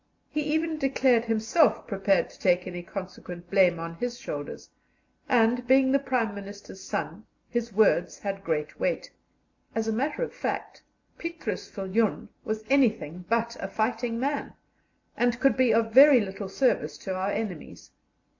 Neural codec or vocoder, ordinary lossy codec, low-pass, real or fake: none; AAC, 48 kbps; 7.2 kHz; real